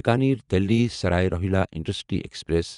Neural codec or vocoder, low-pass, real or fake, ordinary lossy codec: vocoder, 24 kHz, 100 mel bands, Vocos; 10.8 kHz; fake; none